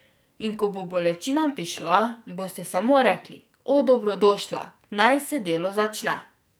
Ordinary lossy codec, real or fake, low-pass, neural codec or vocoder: none; fake; none; codec, 44.1 kHz, 2.6 kbps, SNAC